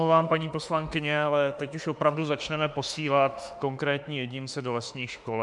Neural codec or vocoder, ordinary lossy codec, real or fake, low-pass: autoencoder, 48 kHz, 32 numbers a frame, DAC-VAE, trained on Japanese speech; MP3, 96 kbps; fake; 10.8 kHz